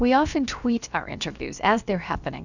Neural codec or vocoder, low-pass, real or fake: codec, 16 kHz, about 1 kbps, DyCAST, with the encoder's durations; 7.2 kHz; fake